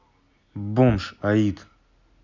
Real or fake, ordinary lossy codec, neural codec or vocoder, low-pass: real; none; none; 7.2 kHz